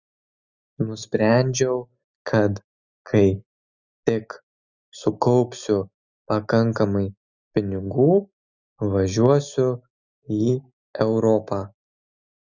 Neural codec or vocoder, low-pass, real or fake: none; 7.2 kHz; real